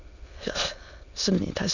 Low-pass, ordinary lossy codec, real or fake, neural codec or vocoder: 7.2 kHz; none; fake; autoencoder, 22.05 kHz, a latent of 192 numbers a frame, VITS, trained on many speakers